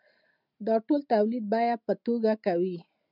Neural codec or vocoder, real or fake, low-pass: none; real; 5.4 kHz